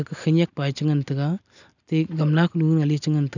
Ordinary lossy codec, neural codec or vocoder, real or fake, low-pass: none; none; real; 7.2 kHz